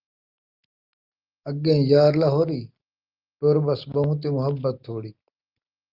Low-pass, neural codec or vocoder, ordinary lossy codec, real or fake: 5.4 kHz; none; Opus, 24 kbps; real